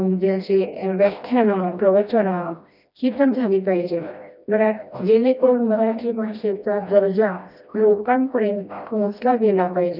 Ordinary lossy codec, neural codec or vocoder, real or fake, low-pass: none; codec, 16 kHz, 1 kbps, FreqCodec, smaller model; fake; 5.4 kHz